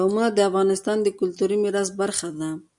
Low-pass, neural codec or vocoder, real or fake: 10.8 kHz; none; real